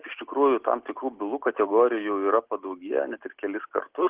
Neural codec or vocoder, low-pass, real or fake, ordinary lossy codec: none; 3.6 kHz; real; Opus, 16 kbps